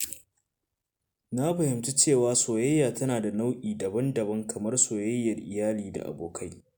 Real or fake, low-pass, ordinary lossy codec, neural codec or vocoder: real; none; none; none